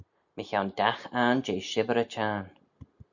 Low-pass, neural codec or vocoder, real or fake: 7.2 kHz; none; real